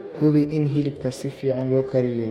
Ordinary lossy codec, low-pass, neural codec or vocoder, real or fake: MP3, 64 kbps; 19.8 kHz; codec, 44.1 kHz, 2.6 kbps, DAC; fake